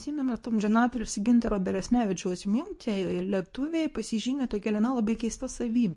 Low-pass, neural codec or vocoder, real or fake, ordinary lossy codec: 10.8 kHz; codec, 24 kHz, 0.9 kbps, WavTokenizer, medium speech release version 2; fake; MP3, 48 kbps